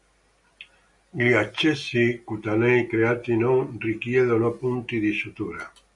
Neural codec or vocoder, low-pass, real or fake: none; 10.8 kHz; real